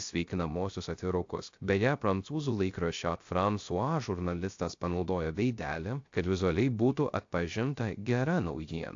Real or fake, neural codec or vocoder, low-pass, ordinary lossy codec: fake; codec, 16 kHz, 0.3 kbps, FocalCodec; 7.2 kHz; AAC, 48 kbps